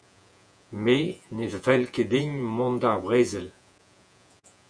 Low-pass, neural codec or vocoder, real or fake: 9.9 kHz; vocoder, 48 kHz, 128 mel bands, Vocos; fake